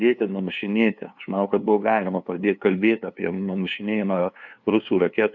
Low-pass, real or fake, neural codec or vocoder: 7.2 kHz; fake; codec, 16 kHz, 2 kbps, FunCodec, trained on LibriTTS, 25 frames a second